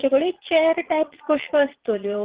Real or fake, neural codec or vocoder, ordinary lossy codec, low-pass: fake; vocoder, 22.05 kHz, 80 mel bands, Vocos; Opus, 16 kbps; 3.6 kHz